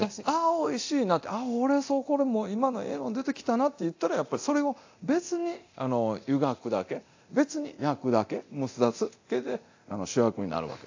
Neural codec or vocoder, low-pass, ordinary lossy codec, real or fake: codec, 24 kHz, 0.9 kbps, DualCodec; 7.2 kHz; AAC, 48 kbps; fake